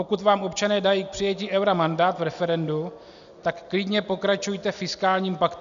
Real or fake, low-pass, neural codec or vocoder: real; 7.2 kHz; none